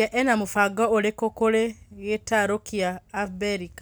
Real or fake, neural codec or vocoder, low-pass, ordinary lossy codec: real; none; none; none